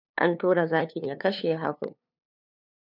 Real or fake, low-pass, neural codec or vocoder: fake; 5.4 kHz; codec, 16 kHz, 2 kbps, FunCodec, trained on LibriTTS, 25 frames a second